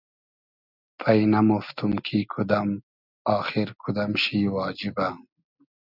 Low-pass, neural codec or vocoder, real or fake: 5.4 kHz; none; real